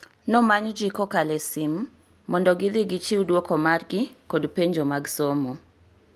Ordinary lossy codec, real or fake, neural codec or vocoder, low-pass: Opus, 32 kbps; real; none; 14.4 kHz